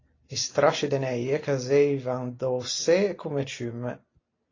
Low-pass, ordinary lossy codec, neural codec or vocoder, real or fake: 7.2 kHz; AAC, 32 kbps; none; real